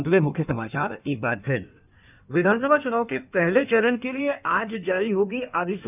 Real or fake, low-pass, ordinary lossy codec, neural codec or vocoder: fake; 3.6 kHz; none; codec, 16 kHz in and 24 kHz out, 1.1 kbps, FireRedTTS-2 codec